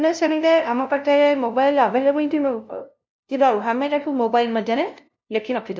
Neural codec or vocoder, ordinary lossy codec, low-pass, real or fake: codec, 16 kHz, 0.5 kbps, FunCodec, trained on LibriTTS, 25 frames a second; none; none; fake